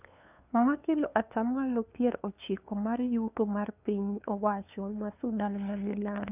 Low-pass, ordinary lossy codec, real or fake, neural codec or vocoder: 3.6 kHz; none; fake; codec, 16 kHz, 2 kbps, FunCodec, trained on LibriTTS, 25 frames a second